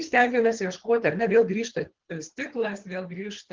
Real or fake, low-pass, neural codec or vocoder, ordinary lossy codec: fake; 7.2 kHz; codec, 24 kHz, 3 kbps, HILCodec; Opus, 16 kbps